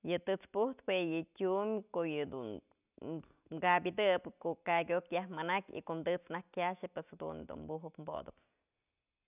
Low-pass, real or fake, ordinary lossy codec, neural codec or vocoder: 3.6 kHz; real; none; none